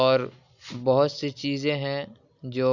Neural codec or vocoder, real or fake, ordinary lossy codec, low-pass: none; real; none; 7.2 kHz